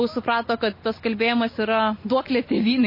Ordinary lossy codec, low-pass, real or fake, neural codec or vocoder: MP3, 24 kbps; 5.4 kHz; real; none